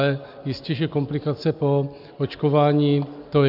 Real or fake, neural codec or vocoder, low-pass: real; none; 5.4 kHz